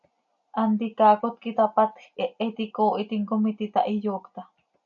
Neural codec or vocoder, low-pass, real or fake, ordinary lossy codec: none; 7.2 kHz; real; MP3, 96 kbps